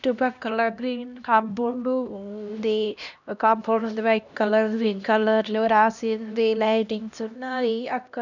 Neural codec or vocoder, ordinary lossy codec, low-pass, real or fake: codec, 16 kHz, 1 kbps, X-Codec, HuBERT features, trained on LibriSpeech; none; 7.2 kHz; fake